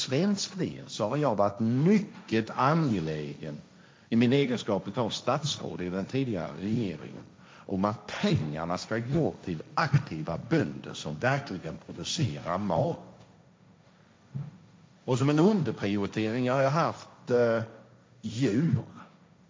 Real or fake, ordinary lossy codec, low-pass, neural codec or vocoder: fake; none; none; codec, 16 kHz, 1.1 kbps, Voila-Tokenizer